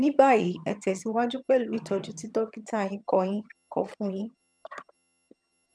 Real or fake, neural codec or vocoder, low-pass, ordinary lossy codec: fake; vocoder, 22.05 kHz, 80 mel bands, HiFi-GAN; none; none